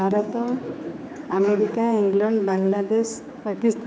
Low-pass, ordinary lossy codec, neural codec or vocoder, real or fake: none; none; codec, 16 kHz, 4 kbps, X-Codec, HuBERT features, trained on general audio; fake